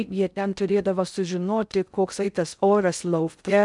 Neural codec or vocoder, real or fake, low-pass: codec, 16 kHz in and 24 kHz out, 0.6 kbps, FocalCodec, streaming, 2048 codes; fake; 10.8 kHz